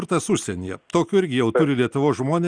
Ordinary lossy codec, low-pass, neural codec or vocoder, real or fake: Opus, 32 kbps; 9.9 kHz; none; real